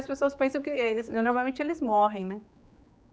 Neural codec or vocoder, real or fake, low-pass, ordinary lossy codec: codec, 16 kHz, 4 kbps, X-Codec, HuBERT features, trained on general audio; fake; none; none